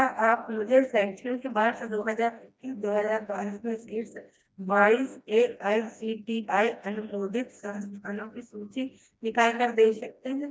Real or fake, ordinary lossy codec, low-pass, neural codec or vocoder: fake; none; none; codec, 16 kHz, 1 kbps, FreqCodec, smaller model